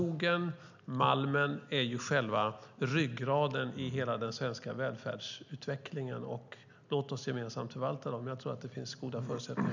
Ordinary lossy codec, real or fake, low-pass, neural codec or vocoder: none; real; 7.2 kHz; none